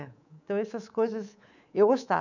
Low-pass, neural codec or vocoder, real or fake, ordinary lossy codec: 7.2 kHz; codec, 24 kHz, 3.1 kbps, DualCodec; fake; none